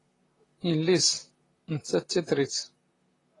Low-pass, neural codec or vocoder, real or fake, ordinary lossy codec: 10.8 kHz; vocoder, 44.1 kHz, 128 mel bands, Pupu-Vocoder; fake; AAC, 32 kbps